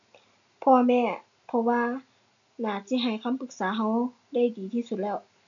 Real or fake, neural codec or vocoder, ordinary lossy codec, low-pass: real; none; none; 7.2 kHz